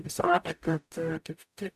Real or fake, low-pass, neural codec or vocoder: fake; 14.4 kHz; codec, 44.1 kHz, 0.9 kbps, DAC